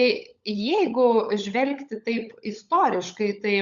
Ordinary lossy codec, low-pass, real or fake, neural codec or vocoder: Opus, 64 kbps; 7.2 kHz; fake; codec, 16 kHz, 16 kbps, FunCodec, trained on LibriTTS, 50 frames a second